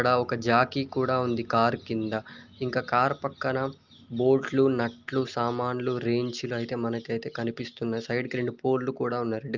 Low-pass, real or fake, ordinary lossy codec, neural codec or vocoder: 7.2 kHz; real; Opus, 32 kbps; none